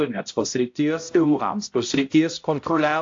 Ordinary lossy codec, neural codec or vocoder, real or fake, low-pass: AAC, 48 kbps; codec, 16 kHz, 0.5 kbps, X-Codec, HuBERT features, trained on balanced general audio; fake; 7.2 kHz